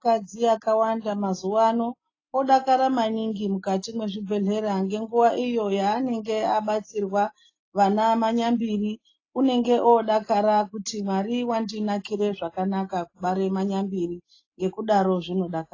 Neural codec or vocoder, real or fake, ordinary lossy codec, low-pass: none; real; AAC, 32 kbps; 7.2 kHz